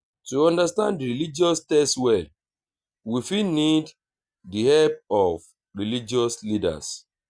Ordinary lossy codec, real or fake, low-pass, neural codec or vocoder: Opus, 64 kbps; real; 9.9 kHz; none